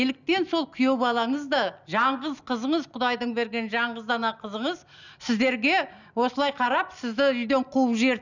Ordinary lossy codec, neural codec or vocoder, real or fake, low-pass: none; none; real; 7.2 kHz